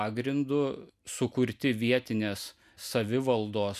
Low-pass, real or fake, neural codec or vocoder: 14.4 kHz; real; none